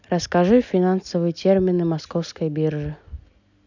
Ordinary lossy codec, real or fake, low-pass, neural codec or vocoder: none; real; 7.2 kHz; none